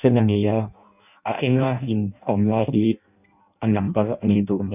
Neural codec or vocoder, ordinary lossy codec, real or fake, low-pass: codec, 16 kHz in and 24 kHz out, 0.6 kbps, FireRedTTS-2 codec; none; fake; 3.6 kHz